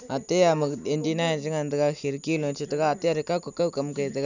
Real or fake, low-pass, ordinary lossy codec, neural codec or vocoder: real; 7.2 kHz; none; none